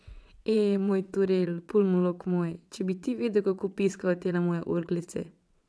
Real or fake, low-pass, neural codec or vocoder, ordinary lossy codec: fake; none; vocoder, 22.05 kHz, 80 mel bands, WaveNeXt; none